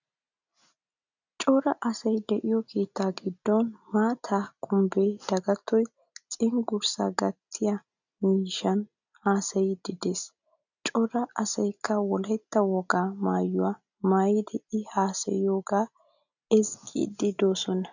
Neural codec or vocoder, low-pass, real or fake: none; 7.2 kHz; real